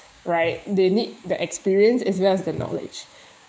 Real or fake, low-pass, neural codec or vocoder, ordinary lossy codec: fake; none; codec, 16 kHz, 6 kbps, DAC; none